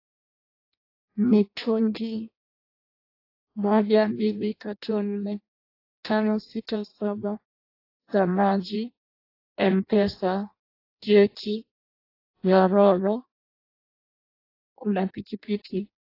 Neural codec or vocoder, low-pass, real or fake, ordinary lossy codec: codec, 16 kHz in and 24 kHz out, 0.6 kbps, FireRedTTS-2 codec; 5.4 kHz; fake; AAC, 32 kbps